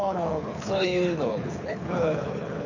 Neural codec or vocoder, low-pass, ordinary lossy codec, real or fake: codec, 24 kHz, 6 kbps, HILCodec; 7.2 kHz; none; fake